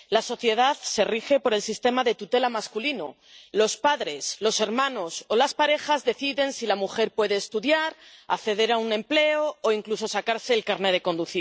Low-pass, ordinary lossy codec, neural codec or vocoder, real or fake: none; none; none; real